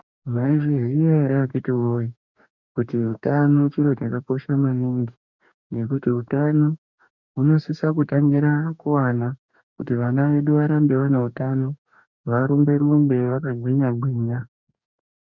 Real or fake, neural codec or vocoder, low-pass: fake; codec, 44.1 kHz, 2.6 kbps, DAC; 7.2 kHz